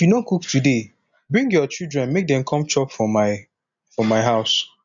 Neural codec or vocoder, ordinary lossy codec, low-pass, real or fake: none; MP3, 96 kbps; 7.2 kHz; real